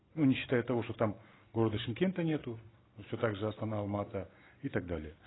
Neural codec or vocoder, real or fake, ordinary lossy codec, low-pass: none; real; AAC, 16 kbps; 7.2 kHz